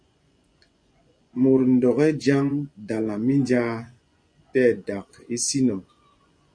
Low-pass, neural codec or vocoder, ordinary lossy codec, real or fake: 9.9 kHz; vocoder, 24 kHz, 100 mel bands, Vocos; MP3, 96 kbps; fake